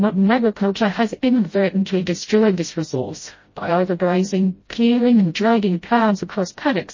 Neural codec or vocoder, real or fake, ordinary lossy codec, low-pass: codec, 16 kHz, 0.5 kbps, FreqCodec, smaller model; fake; MP3, 32 kbps; 7.2 kHz